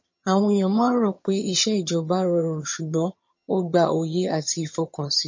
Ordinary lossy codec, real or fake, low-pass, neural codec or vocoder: MP3, 32 kbps; fake; 7.2 kHz; vocoder, 22.05 kHz, 80 mel bands, HiFi-GAN